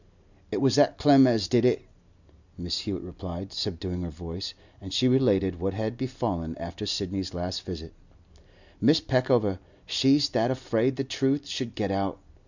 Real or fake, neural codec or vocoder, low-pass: real; none; 7.2 kHz